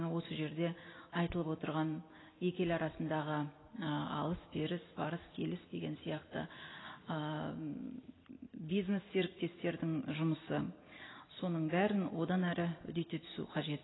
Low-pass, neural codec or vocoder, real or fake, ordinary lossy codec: 7.2 kHz; none; real; AAC, 16 kbps